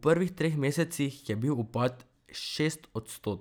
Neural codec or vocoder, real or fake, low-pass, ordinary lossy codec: none; real; none; none